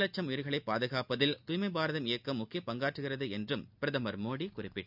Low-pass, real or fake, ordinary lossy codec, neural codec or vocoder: 5.4 kHz; real; none; none